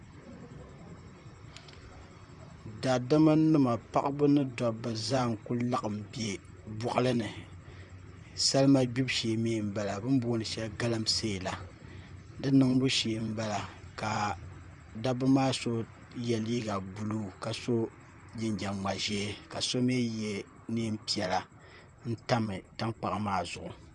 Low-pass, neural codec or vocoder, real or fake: 10.8 kHz; vocoder, 44.1 kHz, 128 mel bands, Pupu-Vocoder; fake